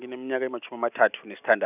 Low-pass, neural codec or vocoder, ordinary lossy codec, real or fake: 3.6 kHz; none; none; real